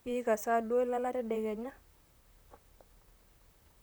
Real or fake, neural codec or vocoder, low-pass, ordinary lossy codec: fake; vocoder, 44.1 kHz, 128 mel bands, Pupu-Vocoder; none; none